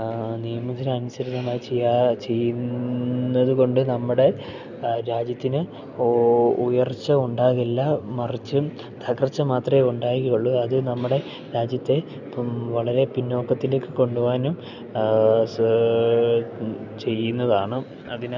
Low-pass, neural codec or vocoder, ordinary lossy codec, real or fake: 7.2 kHz; none; none; real